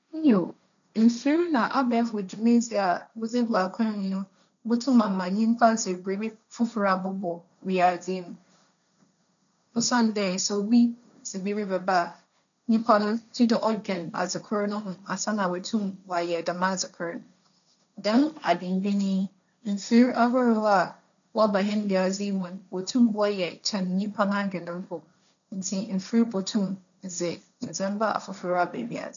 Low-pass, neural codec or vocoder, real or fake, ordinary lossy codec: 7.2 kHz; codec, 16 kHz, 1.1 kbps, Voila-Tokenizer; fake; none